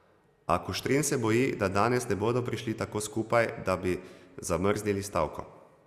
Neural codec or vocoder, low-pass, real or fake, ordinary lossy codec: none; 14.4 kHz; real; Opus, 64 kbps